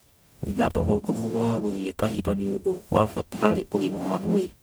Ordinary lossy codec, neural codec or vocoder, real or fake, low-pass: none; codec, 44.1 kHz, 0.9 kbps, DAC; fake; none